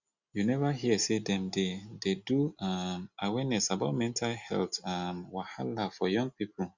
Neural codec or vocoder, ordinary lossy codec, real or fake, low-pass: none; Opus, 64 kbps; real; 7.2 kHz